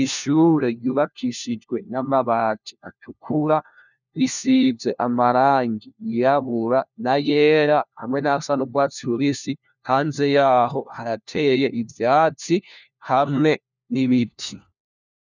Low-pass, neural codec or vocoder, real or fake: 7.2 kHz; codec, 16 kHz, 1 kbps, FunCodec, trained on LibriTTS, 50 frames a second; fake